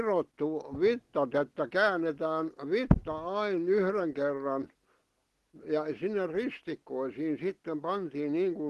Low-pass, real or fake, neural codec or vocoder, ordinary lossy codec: 14.4 kHz; real; none; Opus, 16 kbps